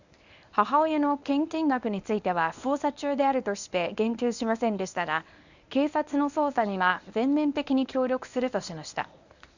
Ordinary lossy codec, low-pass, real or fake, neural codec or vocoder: none; 7.2 kHz; fake; codec, 24 kHz, 0.9 kbps, WavTokenizer, medium speech release version 1